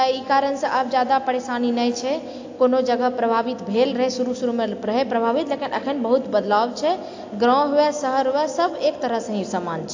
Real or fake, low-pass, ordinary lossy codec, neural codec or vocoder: real; 7.2 kHz; AAC, 48 kbps; none